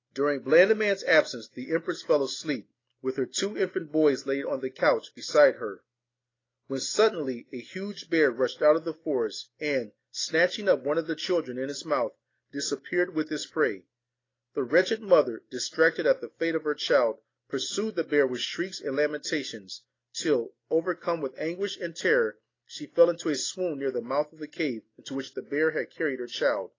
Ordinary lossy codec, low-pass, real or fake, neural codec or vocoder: AAC, 32 kbps; 7.2 kHz; real; none